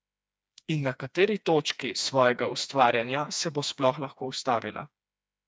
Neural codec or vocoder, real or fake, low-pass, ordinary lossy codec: codec, 16 kHz, 2 kbps, FreqCodec, smaller model; fake; none; none